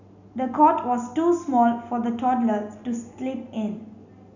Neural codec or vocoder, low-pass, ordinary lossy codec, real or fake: none; 7.2 kHz; none; real